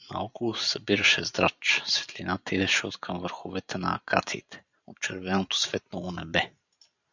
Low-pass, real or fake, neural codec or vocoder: 7.2 kHz; fake; vocoder, 44.1 kHz, 128 mel bands every 512 samples, BigVGAN v2